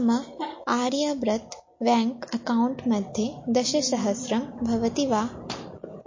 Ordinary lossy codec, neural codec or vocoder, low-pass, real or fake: MP3, 32 kbps; none; 7.2 kHz; real